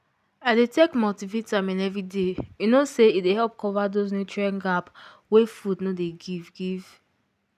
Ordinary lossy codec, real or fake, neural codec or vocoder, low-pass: none; real; none; 14.4 kHz